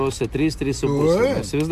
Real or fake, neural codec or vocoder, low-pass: real; none; 14.4 kHz